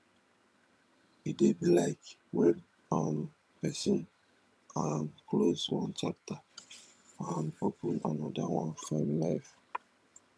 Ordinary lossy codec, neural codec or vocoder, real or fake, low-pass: none; vocoder, 22.05 kHz, 80 mel bands, HiFi-GAN; fake; none